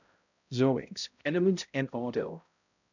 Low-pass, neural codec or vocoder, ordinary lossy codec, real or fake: 7.2 kHz; codec, 16 kHz, 0.5 kbps, X-Codec, HuBERT features, trained on balanced general audio; none; fake